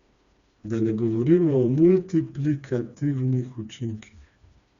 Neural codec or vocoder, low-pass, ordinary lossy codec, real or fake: codec, 16 kHz, 2 kbps, FreqCodec, smaller model; 7.2 kHz; Opus, 64 kbps; fake